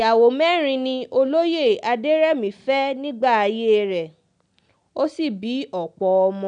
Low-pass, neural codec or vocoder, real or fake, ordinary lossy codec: 9.9 kHz; none; real; none